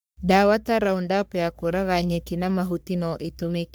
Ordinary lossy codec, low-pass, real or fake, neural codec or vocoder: none; none; fake; codec, 44.1 kHz, 3.4 kbps, Pupu-Codec